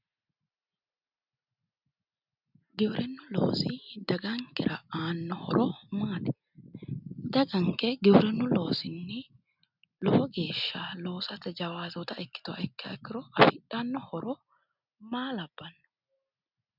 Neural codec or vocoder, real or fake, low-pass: none; real; 5.4 kHz